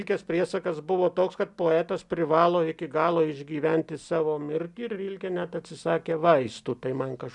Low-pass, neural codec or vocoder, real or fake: 10.8 kHz; none; real